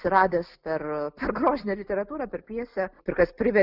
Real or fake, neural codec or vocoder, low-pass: real; none; 5.4 kHz